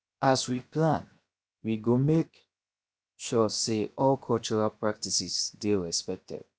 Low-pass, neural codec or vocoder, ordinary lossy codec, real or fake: none; codec, 16 kHz, 0.7 kbps, FocalCodec; none; fake